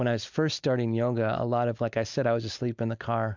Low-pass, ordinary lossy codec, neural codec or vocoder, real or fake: 7.2 kHz; MP3, 64 kbps; none; real